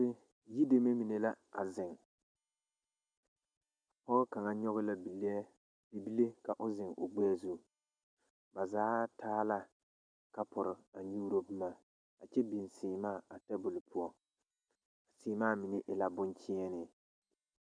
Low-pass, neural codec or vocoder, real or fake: 9.9 kHz; none; real